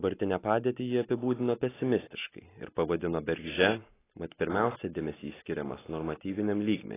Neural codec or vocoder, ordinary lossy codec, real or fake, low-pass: none; AAC, 16 kbps; real; 3.6 kHz